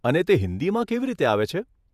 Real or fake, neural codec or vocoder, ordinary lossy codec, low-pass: fake; vocoder, 48 kHz, 128 mel bands, Vocos; none; 14.4 kHz